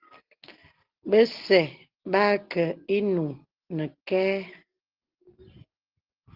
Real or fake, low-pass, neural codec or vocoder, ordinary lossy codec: real; 5.4 kHz; none; Opus, 16 kbps